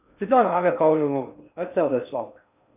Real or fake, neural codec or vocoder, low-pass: fake; codec, 16 kHz in and 24 kHz out, 0.6 kbps, FocalCodec, streaming, 4096 codes; 3.6 kHz